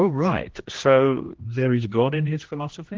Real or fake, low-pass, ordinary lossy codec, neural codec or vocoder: fake; 7.2 kHz; Opus, 16 kbps; codec, 16 kHz, 1 kbps, X-Codec, HuBERT features, trained on general audio